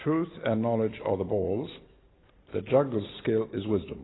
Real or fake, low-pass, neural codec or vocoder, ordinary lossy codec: real; 7.2 kHz; none; AAC, 16 kbps